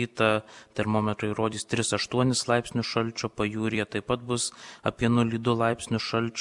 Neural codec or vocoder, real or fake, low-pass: vocoder, 44.1 kHz, 128 mel bands every 256 samples, BigVGAN v2; fake; 10.8 kHz